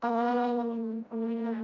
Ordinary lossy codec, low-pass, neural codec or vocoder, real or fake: none; 7.2 kHz; codec, 16 kHz, 0.5 kbps, FreqCodec, smaller model; fake